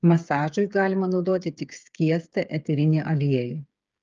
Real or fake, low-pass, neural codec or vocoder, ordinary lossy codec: fake; 7.2 kHz; codec, 16 kHz, 8 kbps, FreqCodec, smaller model; Opus, 24 kbps